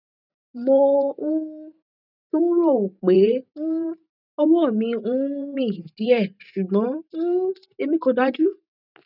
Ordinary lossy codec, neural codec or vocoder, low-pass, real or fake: none; vocoder, 44.1 kHz, 128 mel bands every 512 samples, BigVGAN v2; 5.4 kHz; fake